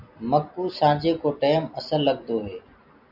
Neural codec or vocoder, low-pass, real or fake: none; 5.4 kHz; real